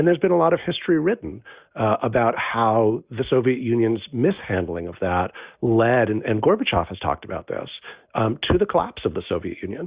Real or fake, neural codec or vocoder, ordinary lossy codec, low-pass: real; none; Opus, 64 kbps; 3.6 kHz